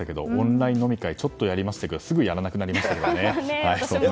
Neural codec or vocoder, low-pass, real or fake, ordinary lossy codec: none; none; real; none